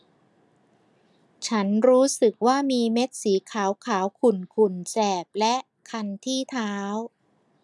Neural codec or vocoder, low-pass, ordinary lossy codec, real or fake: none; none; none; real